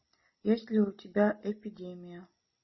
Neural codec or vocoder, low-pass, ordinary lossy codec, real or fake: none; 7.2 kHz; MP3, 24 kbps; real